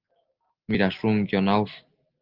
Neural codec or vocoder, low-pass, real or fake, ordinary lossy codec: none; 5.4 kHz; real; Opus, 16 kbps